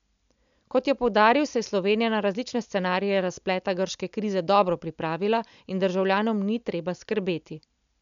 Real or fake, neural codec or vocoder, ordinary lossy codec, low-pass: real; none; none; 7.2 kHz